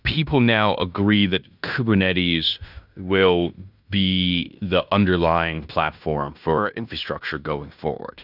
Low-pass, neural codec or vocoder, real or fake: 5.4 kHz; codec, 16 kHz in and 24 kHz out, 0.9 kbps, LongCat-Audio-Codec, fine tuned four codebook decoder; fake